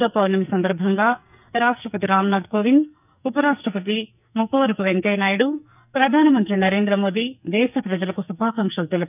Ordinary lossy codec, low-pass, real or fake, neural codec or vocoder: none; 3.6 kHz; fake; codec, 44.1 kHz, 2.6 kbps, SNAC